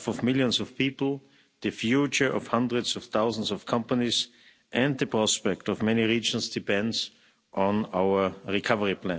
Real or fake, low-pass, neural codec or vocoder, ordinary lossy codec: real; none; none; none